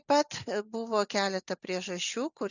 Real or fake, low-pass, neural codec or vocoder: real; 7.2 kHz; none